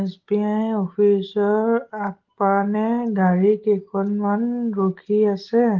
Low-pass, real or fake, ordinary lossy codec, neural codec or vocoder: 7.2 kHz; real; Opus, 16 kbps; none